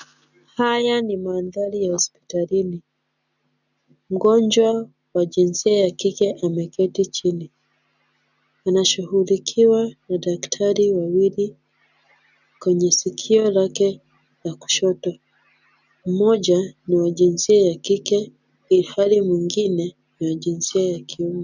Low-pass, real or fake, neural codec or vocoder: 7.2 kHz; real; none